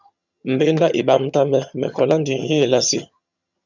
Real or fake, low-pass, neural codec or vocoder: fake; 7.2 kHz; vocoder, 22.05 kHz, 80 mel bands, HiFi-GAN